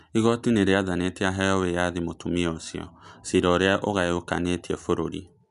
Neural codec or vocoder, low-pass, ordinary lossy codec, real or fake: none; 10.8 kHz; none; real